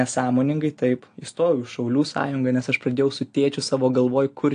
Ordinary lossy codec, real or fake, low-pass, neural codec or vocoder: AAC, 48 kbps; real; 9.9 kHz; none